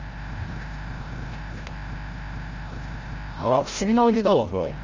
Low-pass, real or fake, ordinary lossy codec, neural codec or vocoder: 7.2 kHz; fake; Opus, 32 kbps; codec, 16 kHz, 0.5 kbps, FreqCodec, larger model